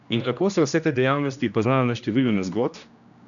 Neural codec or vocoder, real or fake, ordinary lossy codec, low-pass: codec, 16 kHz, 1 kbps, X-Codec, HuBERT features, trained on general audio; fake; none; 7.2 kHz